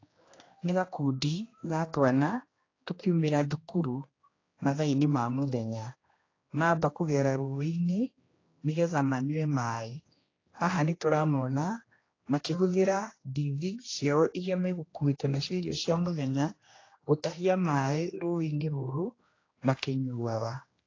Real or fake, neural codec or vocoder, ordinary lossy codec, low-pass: fake; codec, 16 kHz, 1 kbps, X-Codec, HuBERT features, trained on general audio; AAC, 32 kbps; 7.2 kHz